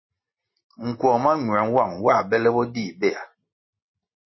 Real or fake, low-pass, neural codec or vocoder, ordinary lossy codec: real; 7.2 kHz; none; MP3, 24 kbps